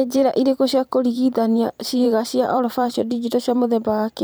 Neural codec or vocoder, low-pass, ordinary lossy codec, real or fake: vocoder, 44.1 kHz, 128 mel bands every 512 samples, BigVGAN v2; none; none; fake